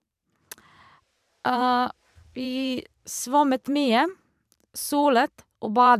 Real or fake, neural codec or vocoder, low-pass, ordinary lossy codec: fake; vocoder, 44.1 kHz, 128 mel bands every 512 samples, BigVGAN v2; 14.4 kHz; none